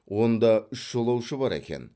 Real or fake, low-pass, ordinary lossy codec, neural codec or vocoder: real; none; none; none